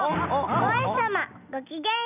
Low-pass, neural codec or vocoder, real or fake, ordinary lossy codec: 3.6 kHz; none; real; none